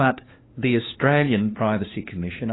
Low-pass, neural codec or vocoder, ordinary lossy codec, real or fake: 7.2 kHz; codec, 16 kHz, 2 kbps, FunCodec, trained on LibriTTS, 25 frames a second; AAC, 16 kbps; fake